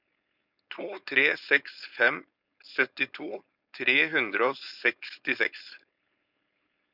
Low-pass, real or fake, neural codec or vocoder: 5.4 kHz; fake; codec, 16 kHz, 4.8 kbps, FACodec